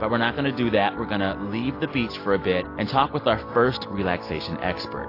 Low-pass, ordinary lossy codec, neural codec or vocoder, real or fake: 5.4 kHz; AAC, 32 kbps; none; real